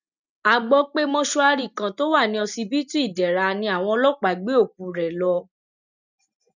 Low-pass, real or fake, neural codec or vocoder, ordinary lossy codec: 7.2 kHz; real; none; none